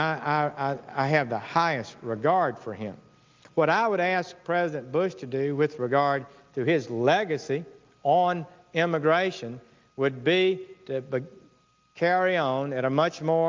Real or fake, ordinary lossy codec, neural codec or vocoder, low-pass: real; Opus, 24 kbps; none; 7.2 kHz